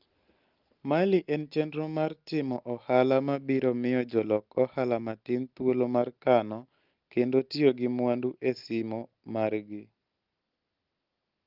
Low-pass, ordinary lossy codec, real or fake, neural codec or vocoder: 5.4 kHz; Opus, 32 kbps; real; none